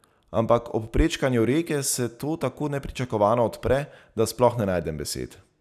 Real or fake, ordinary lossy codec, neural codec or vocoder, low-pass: real; none; none; 14.4 kHz